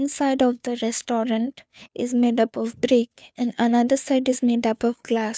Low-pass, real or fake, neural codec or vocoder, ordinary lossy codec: none; fake; codec, 16 kHz, 2 kbps, FunCodec, trained on Chinese and English, 25 frames a second; none